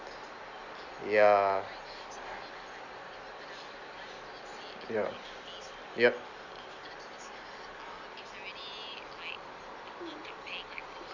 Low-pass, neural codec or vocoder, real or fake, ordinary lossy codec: 7.2 kHz; none; real; Opus, 64 kbps